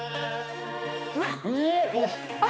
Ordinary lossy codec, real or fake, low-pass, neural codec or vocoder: none; fake; none; codec, 16 kHz, 2 kbps, X-Codec, HuBERT features, trained on balanced general audio